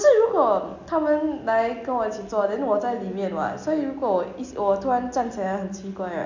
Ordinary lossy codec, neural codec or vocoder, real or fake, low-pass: none; none; real; 7.2 kHz